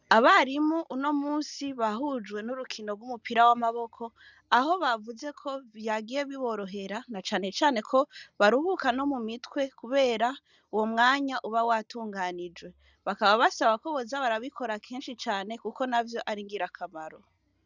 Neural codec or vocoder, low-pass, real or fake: none; 7.2 kHz; real